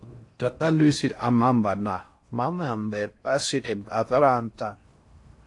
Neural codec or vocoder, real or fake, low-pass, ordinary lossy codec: codec, 16 kHz in and 24 kHz out, 0.6 kbps, FocalCodec, streaming, 4096 codes; fake; 10.8 kHz; AAC, 48 kbps